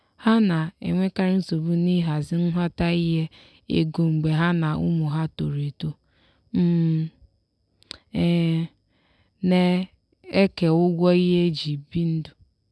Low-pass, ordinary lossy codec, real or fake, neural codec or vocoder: none; none; real; none